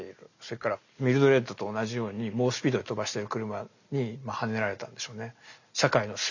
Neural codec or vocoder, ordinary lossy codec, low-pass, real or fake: none; none; 7.2 kHz; real